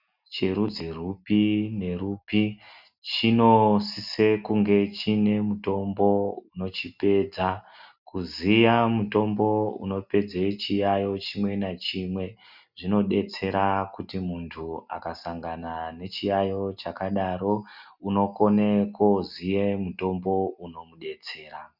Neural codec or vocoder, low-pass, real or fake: none; 5.4 kHz; real